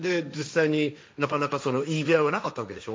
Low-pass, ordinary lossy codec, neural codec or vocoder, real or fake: none; none; codec, 16 kHz, 1.1 kbps, Voila-Tokenizer; fake